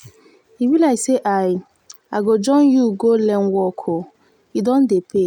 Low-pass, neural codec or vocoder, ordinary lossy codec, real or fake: 19.8 kHz; none; none; real